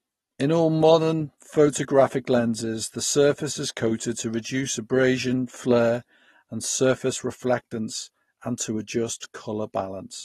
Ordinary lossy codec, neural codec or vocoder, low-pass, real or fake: AAC, 32 kbps; none; 19.8 kHz; real